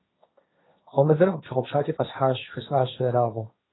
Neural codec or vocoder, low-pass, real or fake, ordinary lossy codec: codec, 16 kHz, 1.1 kbps, Voila-Tokenizer; 7.2 kHz; fake; AAC, 16 kbps